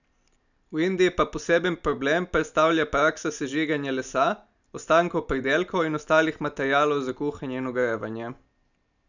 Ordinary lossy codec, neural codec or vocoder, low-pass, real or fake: none; none; 7.2 kHz; real